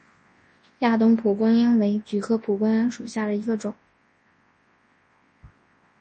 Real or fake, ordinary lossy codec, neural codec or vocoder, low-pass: fake; MP3, 32 kbps; codec, 24 kHz, 0.9 kbps, WavTokenizer, large speech release; 10.8 kHz